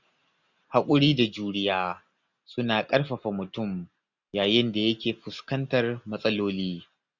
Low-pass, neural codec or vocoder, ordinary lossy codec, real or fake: 7.2 kHz; none; none; real